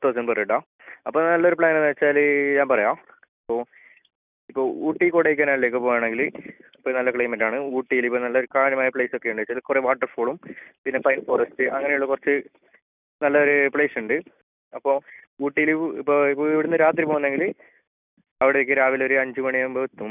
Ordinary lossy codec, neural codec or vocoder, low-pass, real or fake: none; none; 3.6 kHz; real